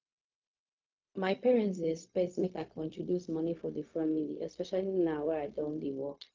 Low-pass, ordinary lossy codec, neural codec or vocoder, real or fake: 7.2 kHz; Opus, 24 kbps; codec, 16 kHz, 0.4 kbps, LongCat-Audio-Codec; fake